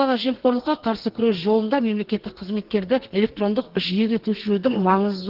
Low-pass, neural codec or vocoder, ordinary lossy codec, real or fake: 5.4 kHz; codec, 32 kHz, 1.9 kbps, SNAC; Opus, 16 kbps; fake